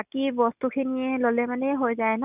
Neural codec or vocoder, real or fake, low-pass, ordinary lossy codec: none; real; 3.6 kHz; none